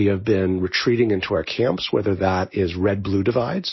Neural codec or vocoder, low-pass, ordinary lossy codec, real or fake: none; 7.2 kHz; MP3, 24 kbps; real